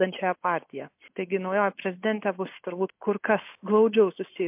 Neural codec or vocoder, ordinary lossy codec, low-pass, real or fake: none; MP3, 32 kbps; 3.6 kHz; real